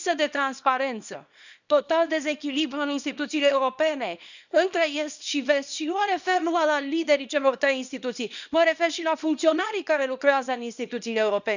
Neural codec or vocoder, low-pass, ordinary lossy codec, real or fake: codec, 24 kHz, 0.9 kbps, WavTokenizer, small release; 7.2 kHz; none; fake